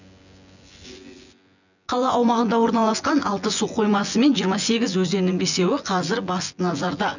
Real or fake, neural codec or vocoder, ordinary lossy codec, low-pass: fake; vocoder, 24 kHz, 100 mel bands, Vocos; none; 7.2 kHz